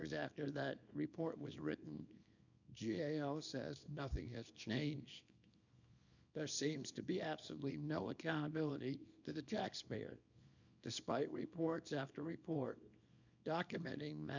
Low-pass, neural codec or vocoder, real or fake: 7.2 kHz; codec, 24 kHz, 0.9 kbps, WavTokenizer, small release; fake